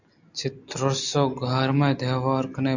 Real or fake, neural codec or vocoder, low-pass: real; none; 7.2 kHz